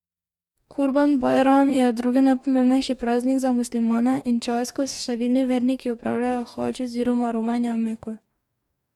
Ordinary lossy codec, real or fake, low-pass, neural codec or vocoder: MP3, 96 kbps; fake; 19.8 kHz; codec, 44.1 kHz, 2.6 kbps, DAC